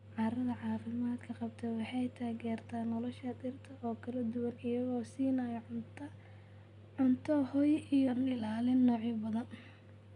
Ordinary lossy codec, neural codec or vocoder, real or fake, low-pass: none; none; real; 10.8 kHz